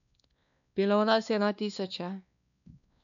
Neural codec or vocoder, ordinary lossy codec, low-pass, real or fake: codec, 16 kHz, 2 kbps, X-Codec, WavLM features, trained on Multilingual LibriSpeech; none; 7.2 kHz; fake